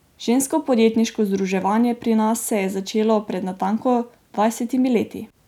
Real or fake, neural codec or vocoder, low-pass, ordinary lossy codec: real; none; 19.8 kHz; none